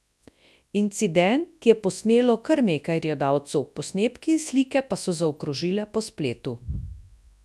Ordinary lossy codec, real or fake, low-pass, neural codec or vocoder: none; fake; none; codec, 24 kHz, 0.9 kbps, WavTokenizer, large speech release